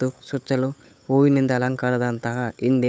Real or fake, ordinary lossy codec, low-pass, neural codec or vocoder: fake; none; none; codec, 16 kHz, 8 kbps, FunCodec, trained on Chinese and English, 25 frames a second